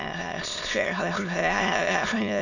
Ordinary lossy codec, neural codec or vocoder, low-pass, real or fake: none; autoencoder, 22.05 kHz, a latent of 192 numbers a frame, VITS, trained on many speakers; 7.2 kHz; fake